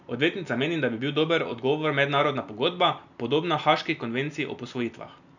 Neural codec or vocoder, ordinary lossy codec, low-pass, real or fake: none; none; 7.2 kHz; real